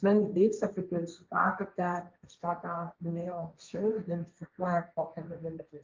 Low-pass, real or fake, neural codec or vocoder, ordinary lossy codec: 7.2 kHz; fake; codec, 16 kHz, 1.1 kbps, Voila-Tokenizer; Opus, 24 kbps